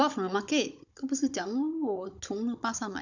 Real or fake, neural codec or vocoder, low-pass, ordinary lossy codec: fake; codec, 16 kHz, 16 kbps, FunCodec, trained on Chinese and English, 50 frames a second; 7.2 kHz; none